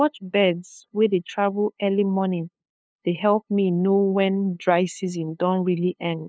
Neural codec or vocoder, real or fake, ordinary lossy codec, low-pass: codec, 16 kHz, 2 kbps, FunCodec, trained on LibriTTS, 25 frames a second; fake; none; none